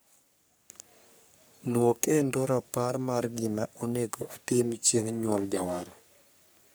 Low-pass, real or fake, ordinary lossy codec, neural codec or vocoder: none; fake; none; codec, 44.1 kHz, 3.4 kbps, Pupu-Codec